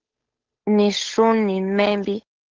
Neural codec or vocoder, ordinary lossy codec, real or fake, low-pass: codec, 16 kHz, 8 kbps, FunCodec, trained on Chinese and English, 25 frames a second; Opus, 16 kbps; fake; 7.2 kHz